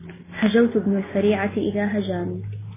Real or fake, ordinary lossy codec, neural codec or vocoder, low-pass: real; MP3, 16 kbps; none; 3.6 kHz